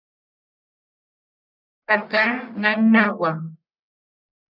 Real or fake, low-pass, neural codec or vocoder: fake; 5.4 kHz; codec, 44.1 kHz, 1.7 kbps, Pupu-Codec